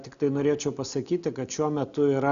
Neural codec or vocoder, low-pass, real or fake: none; 7.2 kHz; real